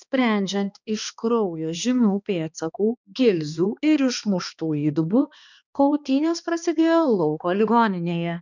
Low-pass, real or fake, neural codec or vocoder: 7.2 kHz; fake; codec, 16 kHz, 2 kbps, X-Codec, HuBERT features, trained on balanced general audio